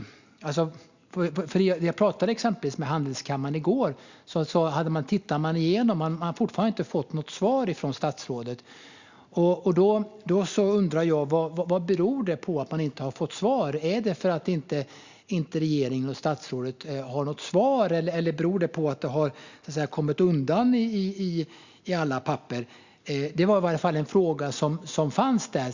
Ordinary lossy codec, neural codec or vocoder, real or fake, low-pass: Opus, 64 kbps; none; real; 7.2 kHz